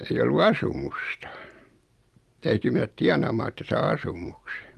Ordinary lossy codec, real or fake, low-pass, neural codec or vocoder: Opus, 32 kbps; real; 14.4 kHz; none